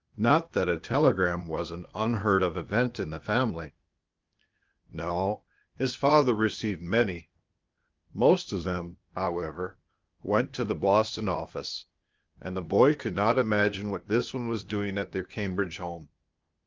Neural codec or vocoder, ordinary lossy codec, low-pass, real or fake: codec, 16 kHz, 0.8 kbps, ZipCodec; Opus, 24 kbps; 7.2 kHz; fake